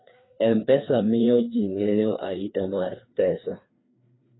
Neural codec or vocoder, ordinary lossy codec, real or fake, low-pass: codec, 16 kHz, 2 kbps, FreqCodec, larger model; AAC, 16 kbps; fake; 7.2 kHz